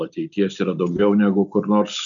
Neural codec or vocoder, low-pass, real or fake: none; 7.2 kHz; real